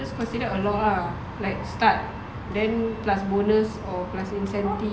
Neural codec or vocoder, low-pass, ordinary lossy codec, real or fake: none; none; none; real